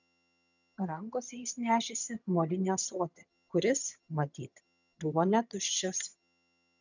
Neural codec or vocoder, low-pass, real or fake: vocoder, 22.05 kHz, 80 mel bands, HiFi-GAN; 7.2 kHz; fake